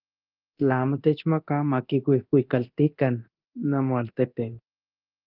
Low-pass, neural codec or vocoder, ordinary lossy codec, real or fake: 5.4 kHz; codec, 24 kHz, 0.9 kbps, DualCodec; Opus, 24 kbps; fake